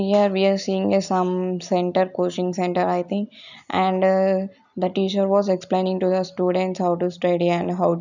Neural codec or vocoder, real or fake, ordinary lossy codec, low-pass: none; real; none; 7.2 kHz